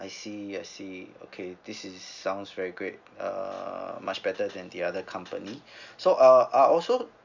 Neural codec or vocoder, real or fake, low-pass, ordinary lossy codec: none; real; 7.2 kHz; none